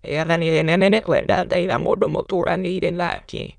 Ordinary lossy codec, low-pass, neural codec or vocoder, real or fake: none; 9.9 kHz; autoencoder, 22.05 kHz, a latent of 192 numbers a frame, VITS, trained on many speakers; fake